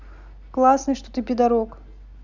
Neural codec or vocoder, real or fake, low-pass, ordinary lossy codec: none; real; 7.2 kHz; none